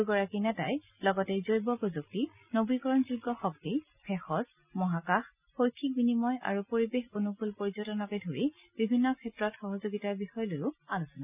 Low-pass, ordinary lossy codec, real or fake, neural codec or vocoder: 3.6 kHz; none; real; none